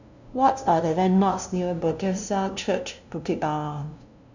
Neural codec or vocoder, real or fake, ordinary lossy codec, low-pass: codec, 16 kHz, 0.5 kbps, FunCodec, trained on LibriTTS, 25 frames a second; fake; none; 7.2 kHz